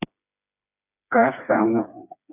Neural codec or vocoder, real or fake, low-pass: codec, 16 kHz, 4 kbps, FreqCodec, smaller model; fake; 3.6 kHz